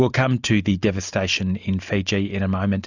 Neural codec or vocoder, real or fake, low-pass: none; real; 7.2 kHz